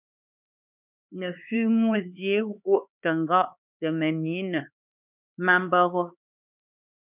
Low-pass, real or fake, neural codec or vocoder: 3.6 kHz; fake; codec, 16 kHz, 4 kbps, X-Codec, HuBERT features, trained on LibriSpeech